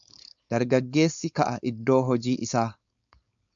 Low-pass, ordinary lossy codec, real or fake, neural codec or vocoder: 7.2 kHz; MP3, 64 kbps; fake; codec, 16 kHz, 4.8 kbps, FACodec